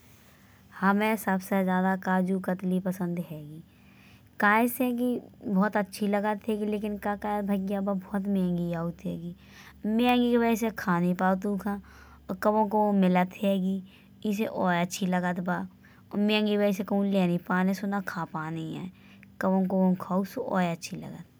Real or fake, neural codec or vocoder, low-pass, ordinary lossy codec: real; none; none; none